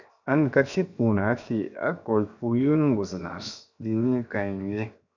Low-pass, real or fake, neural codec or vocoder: 7.2 kHz; fake; codec, 16 kHz, 0.7 kbps, FocalCodec